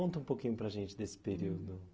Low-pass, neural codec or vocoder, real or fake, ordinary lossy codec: none; none; real; none